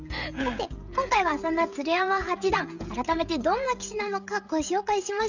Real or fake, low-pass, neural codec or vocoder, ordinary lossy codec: fake; 7.2 kHz; codec, 16 kHz, 16 kbps, FreqCodec, smaller model; none